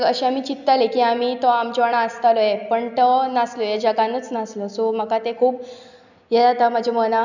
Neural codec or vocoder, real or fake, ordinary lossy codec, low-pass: none; real; none; 7.2 kHz